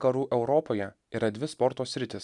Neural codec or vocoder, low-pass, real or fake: none; 10.8 kHz; real